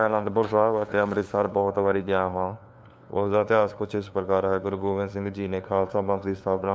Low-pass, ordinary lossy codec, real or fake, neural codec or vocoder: none; none; fake; codec, 16 kHz, 2 kbps, FunCodec, trained on LibriTTS, 25 frames a second